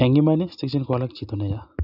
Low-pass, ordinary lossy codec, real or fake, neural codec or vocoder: 5.4 kHz; none; real; none